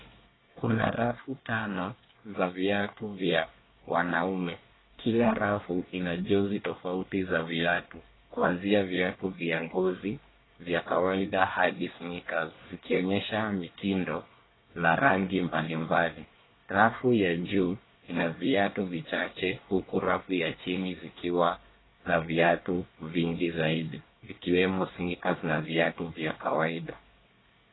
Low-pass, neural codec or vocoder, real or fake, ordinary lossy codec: 7.2 kHz; codec, 24 kHz, 1 kbps, SNAC; fake; AAC, 16 kbps